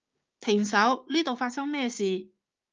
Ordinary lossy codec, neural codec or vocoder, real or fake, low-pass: Opus, 24 kbps; codec, 16 kHz, 6 kbps, DAC; fake; 7.2 kHz